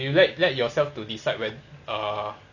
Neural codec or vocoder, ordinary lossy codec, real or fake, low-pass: none; none; real; 7.2 kHz